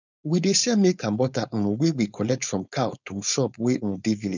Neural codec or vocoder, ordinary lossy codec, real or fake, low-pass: codec, 16 kHz, 4.8 kbps, FACodec; none; fake; 7.2 kHz